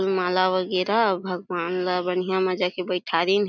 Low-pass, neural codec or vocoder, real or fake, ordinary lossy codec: 7.2 kHz; none; real; none